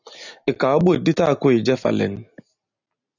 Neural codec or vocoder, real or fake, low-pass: none; real; 7.2 kHz